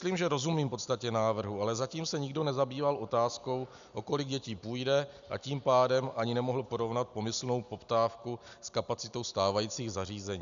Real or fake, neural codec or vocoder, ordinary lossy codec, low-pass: real; none; MP3, 96 kbps; 7.2 kHz